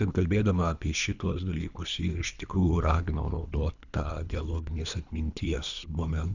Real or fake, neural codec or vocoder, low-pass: fake; codec, 24 kHz, 3 kbps, HILCodec; 7.2 kHz